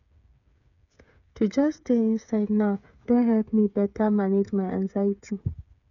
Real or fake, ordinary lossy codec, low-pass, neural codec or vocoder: fake; none; 7.2 kHz; codec, 16 kHz, 8 kbps, FreqCodec, smaller model